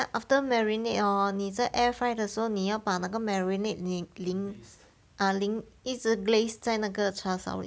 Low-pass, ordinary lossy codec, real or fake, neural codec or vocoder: none; none; real; none